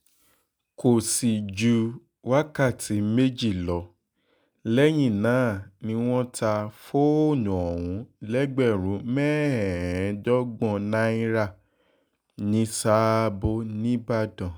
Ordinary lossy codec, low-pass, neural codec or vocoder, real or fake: none; none; none; real